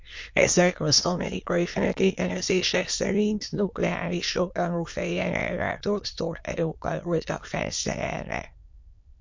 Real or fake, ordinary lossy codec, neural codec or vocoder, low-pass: fake; MP3, 48 kbps; autoencoder, 22.05 kHz, a latent of 192 numbers a frame, VITS, trained on many speakers; 7.2 kHz